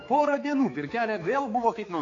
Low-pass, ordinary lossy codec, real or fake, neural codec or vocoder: 7.2 kHz; AAC, 32 kbps; fake; codec, 16 kHz, 4 kbps, X-Codec, HuBERT features, trained on balanced general audio